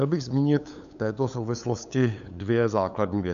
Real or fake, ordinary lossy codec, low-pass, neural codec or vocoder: fake; MP3, 96 kbps; 7.2 kHz; codec, 16 kHz, 8 kbps, FunCodec, trained on LibriTTS, 25 frames a second